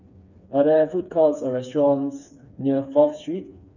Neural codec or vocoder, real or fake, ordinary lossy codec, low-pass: codec, 16 kHz, 4 kbps, FreqCodec, smaller model; fake; none; 7.2 kHz